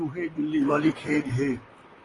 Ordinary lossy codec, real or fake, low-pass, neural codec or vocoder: AAC, 32 kbps; fake; 10.8 kHz; vocoder, 44.1 kHz, 128 mel bands, Pupu-Vocoder